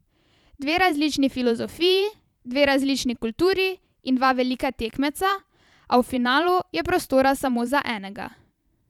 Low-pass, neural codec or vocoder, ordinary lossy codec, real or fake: 19.8 kHz; vocoder, 44.1 kHz, 128 mel bands every 512 samples, BigVGAN v2; none; fake